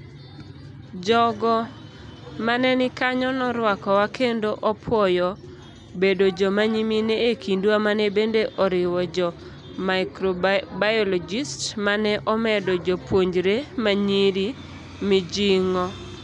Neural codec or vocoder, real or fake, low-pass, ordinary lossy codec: none; real; 10.8 kHz; MP3, 96 kbps